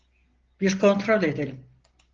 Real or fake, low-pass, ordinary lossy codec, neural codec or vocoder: real; 7.2 kHz; Opus, 16 kbps; none